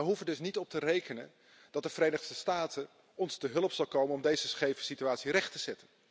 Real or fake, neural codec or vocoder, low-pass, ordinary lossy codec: real; none; none; none